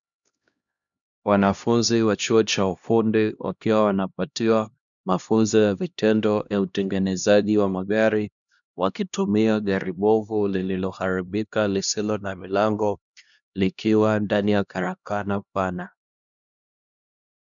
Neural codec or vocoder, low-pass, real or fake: codec, 16 kHz, 1 kbps, X-Codec, HuBERT features, trained on LibriSpeech; 7.2 kHz; fake